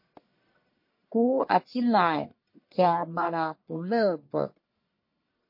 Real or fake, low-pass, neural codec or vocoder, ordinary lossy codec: fake; 5.4 kHz; codec, 44.1 kHz, 1.7 kbps, Pupu-Codec; MP3, 32 kbps